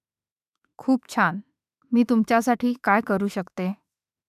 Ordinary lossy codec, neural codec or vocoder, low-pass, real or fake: none; autoencoder, 48 kHz, 32 numbers a frame, DAC-VAE, trained on Japanese speech; 14.4 kHz; fake